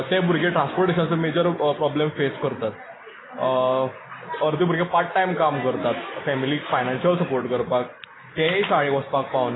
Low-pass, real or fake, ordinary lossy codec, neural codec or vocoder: 7.2 kHz; real; AAC, 16 kbps; none